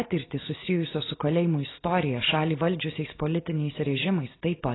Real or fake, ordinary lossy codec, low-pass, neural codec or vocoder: real; AAC, 16 kbps; 7.2 kHz; none